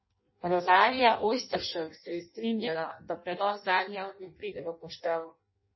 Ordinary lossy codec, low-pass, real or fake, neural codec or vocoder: MP3, 24 kbps; 7.2 kHz; fake; codec, 16 kHz in and 24 kHz out, 0.6 kbps, FireRedTTS-2 codec